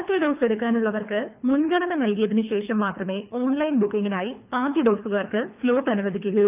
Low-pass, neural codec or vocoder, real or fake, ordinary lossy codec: 3.6 kHz; codec, 24 kHz, 3 kbps, HILCodec; fake; none